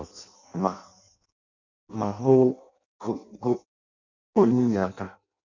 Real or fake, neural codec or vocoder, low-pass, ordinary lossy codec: fake; codec, 16 kHz in and 24 kHz out, 0.6 kbps, FireRedTTS-2 codec; 7.2 kHz; none